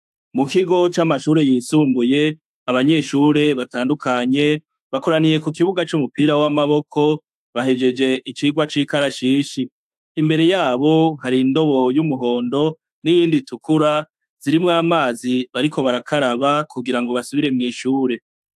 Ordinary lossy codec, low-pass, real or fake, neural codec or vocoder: AAC, 96 kbps; 14.4 kHz; fake; autoencoder, 48 kHz, 32 numbers a frame, DAC-VAE, trained on Japanese speech